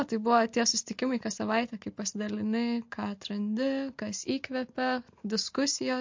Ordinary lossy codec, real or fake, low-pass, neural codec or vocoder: MP3, 48 kbps; real; 7.2 kHz; none